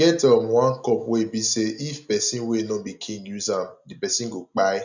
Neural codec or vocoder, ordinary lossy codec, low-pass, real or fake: none; none; 7.2 kHz; real